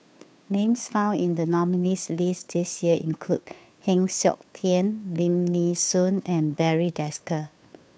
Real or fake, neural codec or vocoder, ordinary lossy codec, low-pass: fake; codec, 16 kHz, 2 kbps, FunCodec, trained on Chinese and English, 25 frames a second; none; none